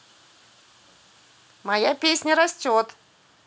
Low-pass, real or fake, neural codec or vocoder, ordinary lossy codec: none; real; none; none